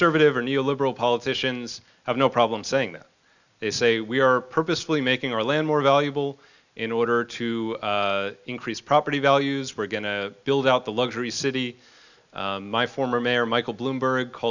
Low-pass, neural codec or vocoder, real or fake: 7.2 kHz; none; real